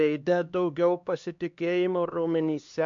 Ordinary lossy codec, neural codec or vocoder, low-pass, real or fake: MP3, 64 kbps; codec, 16 kHz, 2 kbps, X-Codec, HuBERT features, trained on LibriSpeech; 7.2 kHz; fake